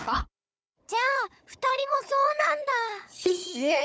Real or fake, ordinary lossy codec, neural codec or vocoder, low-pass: fake; none; codec, 16 kHz, 4 kbps, FunCodec, trained on Chinese and English, 50 frames a second; none